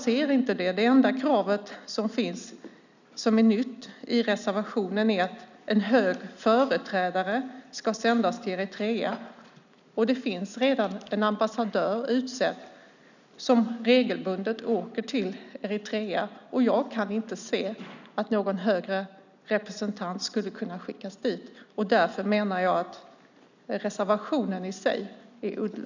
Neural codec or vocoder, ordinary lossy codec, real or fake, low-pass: none; none; real; 7.2 kHz